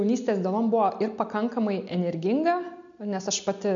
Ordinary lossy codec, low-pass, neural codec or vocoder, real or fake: MP3, 64 kbps; 7.2 kHz; none; real